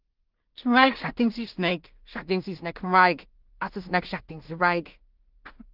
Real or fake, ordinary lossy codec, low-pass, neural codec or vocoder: fake; Opus, 24 kbps; 5.4 kHz; codec, 16 kHz in and 24 kHz out, 0.4 kbps, LongCat-Audio-Codec, two codebook decoder